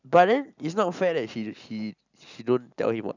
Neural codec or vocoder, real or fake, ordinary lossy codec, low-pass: none; real; none; 7.2 kHz